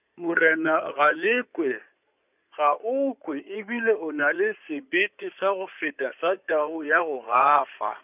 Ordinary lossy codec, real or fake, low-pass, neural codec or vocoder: none; fake; 3.6 kHz; codec, 16 kHz in and 24 kHz out, 2.2 kbps, FireRedTTS-2 codec